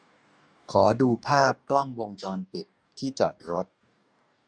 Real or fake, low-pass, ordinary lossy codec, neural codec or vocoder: fake; 9.9 kHz; none; codec, 44.1 kHz, 2.6 kbps, DAC